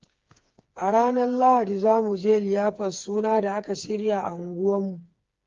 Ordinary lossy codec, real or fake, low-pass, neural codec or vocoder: Opus, 32 kbps; fake; 7.2 kHz; codec, 16 kHz, 4 kbps, FreqCodec, smaller model